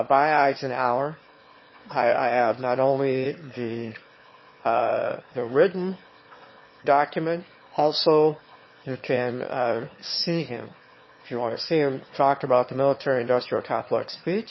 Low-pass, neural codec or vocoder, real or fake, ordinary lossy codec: 7.2 kHz; autoencoder, 22.05 kHz, a latent of 192 numbers a frame, VITS, trained on one speaker; fake; MP3, 24 kbps